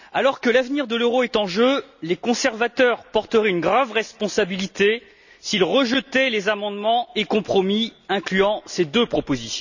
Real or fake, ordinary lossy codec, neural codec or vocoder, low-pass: real; none; none; 7.2 kHz